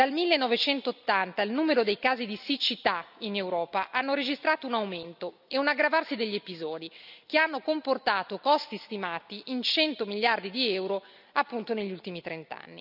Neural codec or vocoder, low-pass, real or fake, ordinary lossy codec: none; 5.4 kHz; real; none